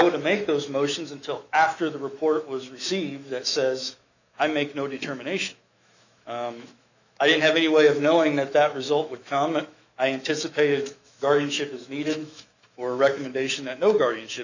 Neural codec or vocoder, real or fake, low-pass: autoencoder, 48 kHz, 128 numbers a frame, DAC-VAE, trained on Japanese speech; fake; 7.2 kHz